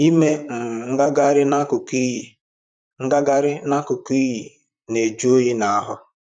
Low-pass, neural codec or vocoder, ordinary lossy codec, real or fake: 9.9 kHz; vocoder, 44.1 kHz, 128 mel bands, Pupu-Vocoder; none; fake